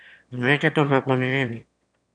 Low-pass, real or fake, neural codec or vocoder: 9.9 kHz; fake; autoencoder, 22.05 kHz, a latent of 192 numbers a frame, VITS, trained on one speaker